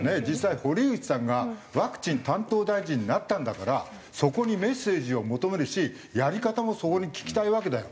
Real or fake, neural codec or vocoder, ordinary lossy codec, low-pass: real; none; none; none